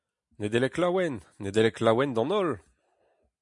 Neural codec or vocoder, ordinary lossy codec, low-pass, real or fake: none; MP3, 64 kbps; 10.8 kHz; real